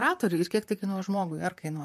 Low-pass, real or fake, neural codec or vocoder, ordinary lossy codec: 14.4 kHz; fake; vocoder, 44.1 kHz, 128 mel bands, Pupu-Vocoder; MP3, 64 kbps